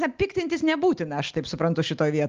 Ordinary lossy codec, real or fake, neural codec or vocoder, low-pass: Opus, 32 kbps; real; none; 7.2 kHz